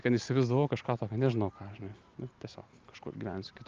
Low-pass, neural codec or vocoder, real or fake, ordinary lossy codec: 7.2 kHz; none; real; Opus, 24 kbps